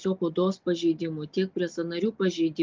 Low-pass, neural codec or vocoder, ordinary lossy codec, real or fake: 7.2 kHz; none; Opus, 32 kbps; real